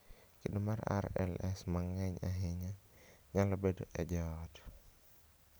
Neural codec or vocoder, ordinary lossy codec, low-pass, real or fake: none; none; none; real